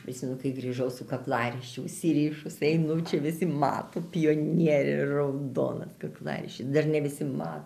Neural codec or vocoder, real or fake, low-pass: none; real; 14.4 kHz